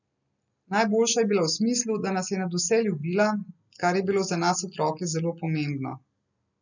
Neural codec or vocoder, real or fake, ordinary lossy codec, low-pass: none; real; none; 7.2 kHz